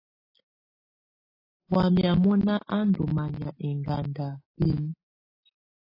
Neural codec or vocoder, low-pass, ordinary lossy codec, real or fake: none; 5.4 kHz; AAC, 24 kbps; real